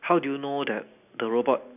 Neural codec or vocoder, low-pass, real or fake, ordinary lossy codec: none; 3.6 kHz; real; none